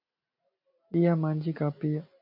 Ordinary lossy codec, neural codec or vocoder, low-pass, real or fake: MP3, 48 kbps; none; 5.4 kHz; real